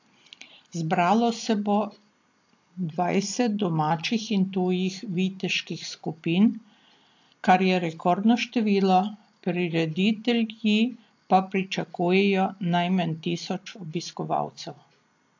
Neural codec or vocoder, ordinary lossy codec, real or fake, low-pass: none; AAC, 48 kbps; real; 7.2 kHz